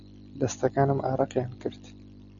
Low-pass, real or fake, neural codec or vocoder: 7.2 kHz; real; none